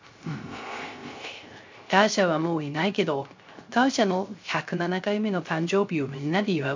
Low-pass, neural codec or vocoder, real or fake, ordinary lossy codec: 7.2 kHz; codec, 16 kHz, 0.3 kbps, FocalCodec; fake; MP3, 48 kbps